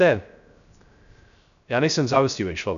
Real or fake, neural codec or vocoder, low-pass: fake; codec, 16 kHz, 0.3 kbps, FocalCodec; 7.2 kHz